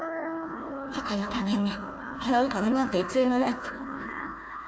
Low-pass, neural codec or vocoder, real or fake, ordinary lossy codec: none; codec, 16 kHz, 1 kbps, FunCodec, trained on Chinese and English, 50 frames a second; fake; none